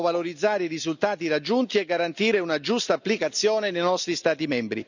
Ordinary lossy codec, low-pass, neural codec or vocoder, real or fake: none; 7.2 kHz; none; real